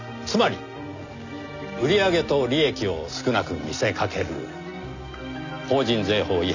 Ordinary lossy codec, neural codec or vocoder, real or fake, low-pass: none; none; real; 7.2 kHz